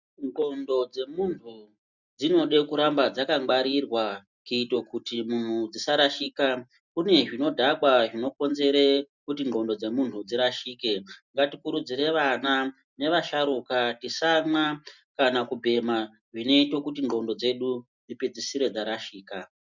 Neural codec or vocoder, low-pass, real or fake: none; 7.2 kHz; real